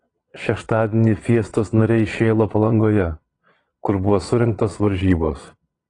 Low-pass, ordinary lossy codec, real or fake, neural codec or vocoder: 9.9 kHz; AAC, 32 kbps; fake; vocoder, 22.05 kHz, 80 mel bands, Vocos